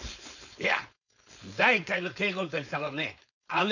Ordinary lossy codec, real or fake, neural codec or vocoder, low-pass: none; fake; codec, 16 kHz, 4.8 kbps, FACodec; 7.2 kHz